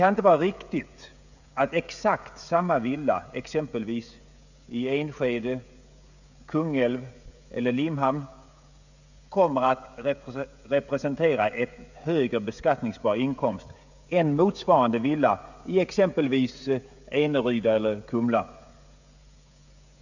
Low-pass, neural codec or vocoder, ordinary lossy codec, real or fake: 7.2 kHz; codec, 16 kHz, 16 kbps, FreqCodec, smaller model; none; fake